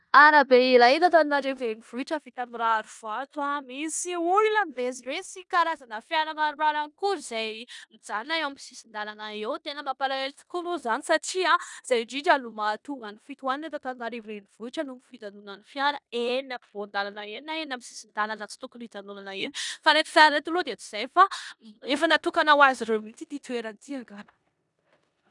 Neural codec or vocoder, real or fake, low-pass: codec, 16 kHz in and 24 kHz out, 0.9 kbps, LongCat-Audio-Codec, four codebook decoder; fake; 10.8 kHz